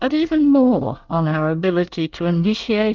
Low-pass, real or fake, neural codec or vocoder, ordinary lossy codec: 7.2 kHz; fake; codec, 24 kHz, 1 kbps, SNAC; Opus, 32 kbps